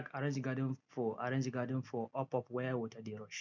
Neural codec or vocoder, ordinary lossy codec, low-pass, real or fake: none; none; 7.2 kHz; real